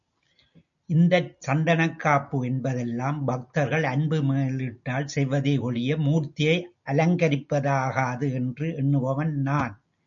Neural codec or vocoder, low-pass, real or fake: none; 7.2 kHz; real